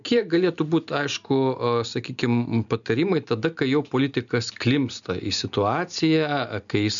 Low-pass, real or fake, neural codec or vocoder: 7.2 kHz; real; none